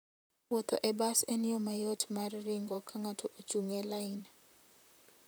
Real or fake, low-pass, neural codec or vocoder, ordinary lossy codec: fake; none; vocoder, 44.1 kHz, 128 mel bands, Pupu-Vocoder; none